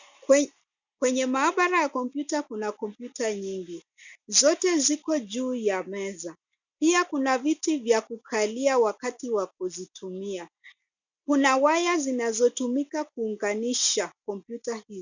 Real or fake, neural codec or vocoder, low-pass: real; none; 7.2 kHz